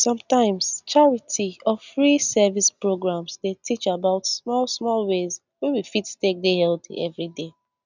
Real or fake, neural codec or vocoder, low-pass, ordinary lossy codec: real; none; 7.2 kHz; none